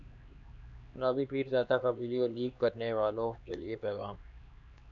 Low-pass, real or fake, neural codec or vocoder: 7.2 kHz; fake; codec, 16 kHz, 2 kbps, X-Codec, HuBERT features, trained on LibriSpeech